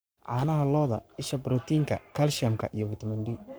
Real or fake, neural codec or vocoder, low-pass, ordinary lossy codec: fake; codec, 44.1 kHz, 7.8 kbps, Pupu-Codec; none; none